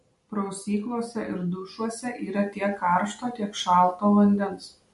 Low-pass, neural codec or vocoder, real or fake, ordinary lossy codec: 14.4 kHz; none; real; MP3, 48 kbps